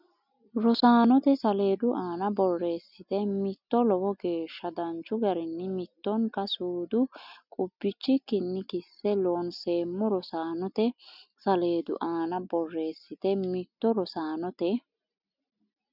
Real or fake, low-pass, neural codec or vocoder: real; 5.4 kHz; none